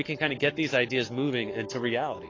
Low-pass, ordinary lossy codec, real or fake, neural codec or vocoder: 7.2 kHz; AAC, 32 kbps; fake; codec, 44.1 kHz, 7.8 kbps, Pupu-Codec